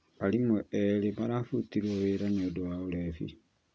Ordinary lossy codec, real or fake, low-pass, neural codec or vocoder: none; real; none; none